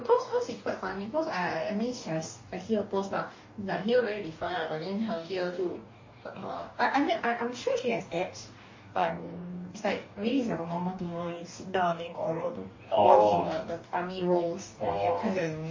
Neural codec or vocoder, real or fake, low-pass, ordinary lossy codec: codec, 44.1 kHz, 2.6 kbps, DAC; fake; 7.2 kHz; MP3, 32 kbps